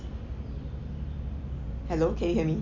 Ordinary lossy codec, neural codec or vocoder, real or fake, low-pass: Opus, 64 kbps; none; real; 7.2 kHz